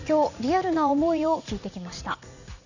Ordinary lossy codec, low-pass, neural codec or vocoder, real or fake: none; 7.2 kHz; vocoder, 44.1 kHz, 80 mel bands, Vocos; fake